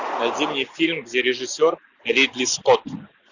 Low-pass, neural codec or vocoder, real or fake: 7.2 kHz; none; real